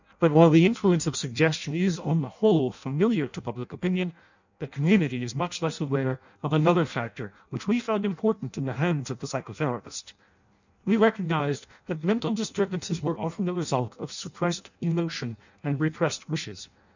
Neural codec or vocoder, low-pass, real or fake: codec, 16 kHz in and 24 kHz out, 0.6 kbps, FireRedTTS-2 codec; 7.2 kHz; fake